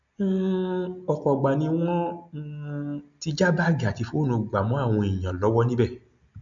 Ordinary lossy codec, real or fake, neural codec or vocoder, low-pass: MP3, 64 kbps; real; none; 7.2 kHz